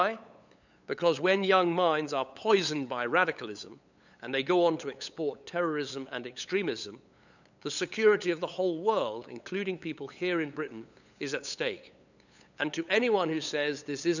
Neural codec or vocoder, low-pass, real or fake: codec, 16 kHz, 8 kbps, FunCodec, trained on LibriTTS, 25 frames a second; 7.2 kHz; fake